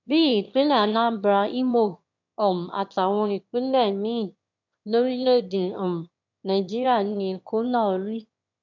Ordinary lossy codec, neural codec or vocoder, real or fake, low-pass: MP3, 64 kbps; autoencoder, 22.05 kHz, a latent of 192 numbers a frame, VITS, trained on one speaker; fake; 7.2 kHz